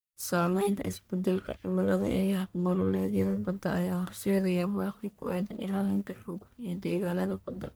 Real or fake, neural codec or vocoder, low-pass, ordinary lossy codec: fake; codec, 44.1 kHz, 1.7 kbps, Pupu-Codec; none; none